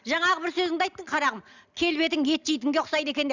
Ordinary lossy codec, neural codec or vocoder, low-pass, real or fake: Opus, 64 kbps; none; 7.2 kHz; real